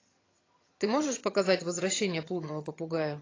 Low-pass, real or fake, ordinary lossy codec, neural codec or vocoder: 7.2 kHz; fake; AAC, 32 kbps; vocoder, 22.05 kHz, 80 mel bands, HiFi-GAN